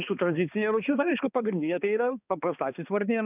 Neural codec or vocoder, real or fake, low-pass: codec, 16 kHz, 2 kbps, X-Codec, HuBERT features, trained on balanced general audio; fake; 3.6 kHz